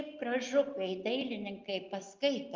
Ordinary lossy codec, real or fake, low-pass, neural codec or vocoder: Opus, 24 kbps; real; 7.2 kHz; none